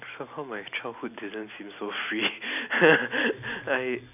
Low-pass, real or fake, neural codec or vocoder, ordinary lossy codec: 3.6 kHz; real; none; none